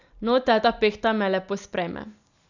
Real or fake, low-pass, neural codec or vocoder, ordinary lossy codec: real; 7.2 kHz; none; none